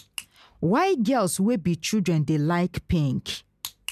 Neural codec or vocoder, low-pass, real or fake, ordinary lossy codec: none; 14.4 kHz; real; none